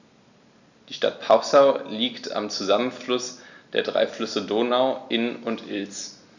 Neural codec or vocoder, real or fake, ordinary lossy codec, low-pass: none; real; none; 7.2 kHz